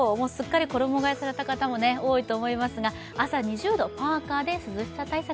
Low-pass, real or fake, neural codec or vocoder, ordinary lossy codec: none; real; none; none